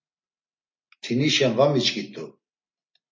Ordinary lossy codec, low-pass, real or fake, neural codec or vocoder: MP3, 32 kbps; 7.2 kHz; real; none